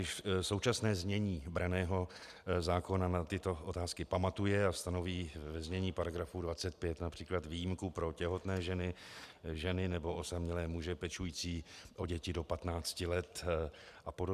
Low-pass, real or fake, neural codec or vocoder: 14.4 kHz; real; none